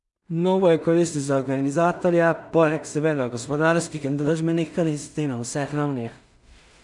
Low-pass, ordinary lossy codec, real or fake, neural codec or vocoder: 10.8 kHz; none; fake; codec, 16 kHz in and 24 kHz out, 0.4 kbps, LongCat-Audio-Codec, two codebook decoder